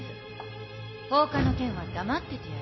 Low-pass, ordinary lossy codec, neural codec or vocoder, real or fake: 7.2 kHz; MP3, 24 kbps; none; real